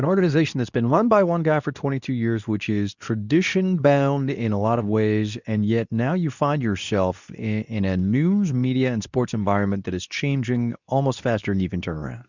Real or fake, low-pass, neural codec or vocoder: fake; 7.2 kHz; codec, 24 kHz, 0.9 kbps, WavTokenizer, medium speech release version 2